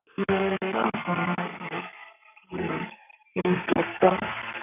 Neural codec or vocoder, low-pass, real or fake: codec, 32 kHz, 1.9 kbps, SNAC; 3.6 kHz; fake